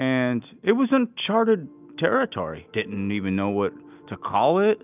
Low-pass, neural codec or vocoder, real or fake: 3.6 kHz; none; real